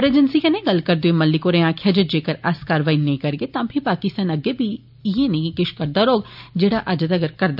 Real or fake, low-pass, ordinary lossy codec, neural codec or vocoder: real; 5.4 kHz; none; none